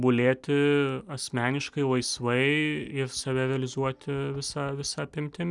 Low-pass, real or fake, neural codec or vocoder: 10.8 kHz; real; none